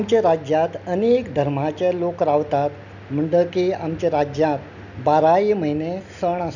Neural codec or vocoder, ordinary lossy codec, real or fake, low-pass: none; none; real; 7.2 kHz